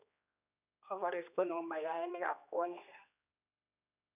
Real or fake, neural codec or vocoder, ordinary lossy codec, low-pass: fake; codec, 16 kHz, 2 kbps, X-Codec, HuBERT features, trained on general audio; none; 3.6 kHz